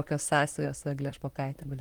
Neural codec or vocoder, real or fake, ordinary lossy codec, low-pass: vocoder, 44.1 kHz, 128 mel bands, Pupu-Vocoder; fake; Opus, 24 kbps; 19.8 kHz